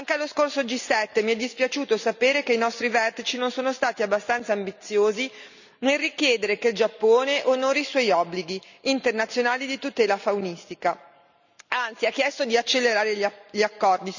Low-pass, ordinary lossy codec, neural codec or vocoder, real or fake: 7.2 kHz; none; none; real